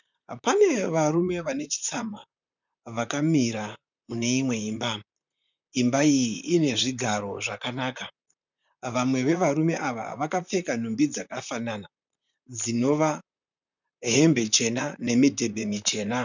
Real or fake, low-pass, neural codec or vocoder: fake; 7.2 kHz; vocoder, 44.1 kHz, 128 mel bands, Pupu-Vocoder